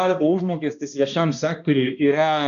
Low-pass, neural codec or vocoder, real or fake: 7.2 kHz; codec, 16 kHz, 1 kbps, X-Codec, HuBERT features, trained on balanced general audio; fake